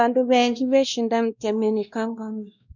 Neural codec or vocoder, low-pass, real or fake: codec, 16 kHz, 1 kbps, X-Codec, WavLM features, trained on Multilingual LibriSpeech; 7.2 kHz; fake